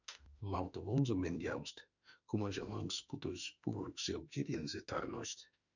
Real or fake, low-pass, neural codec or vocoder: fake; 7.2 kHz; autoencoder, 48 kHz, 32 numbers a frame, DAC-VAE, trained on Japanese speech